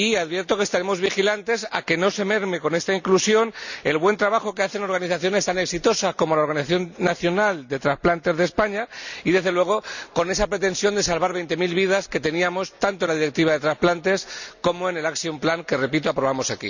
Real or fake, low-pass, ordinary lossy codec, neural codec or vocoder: real; 7.2 kHz; none; none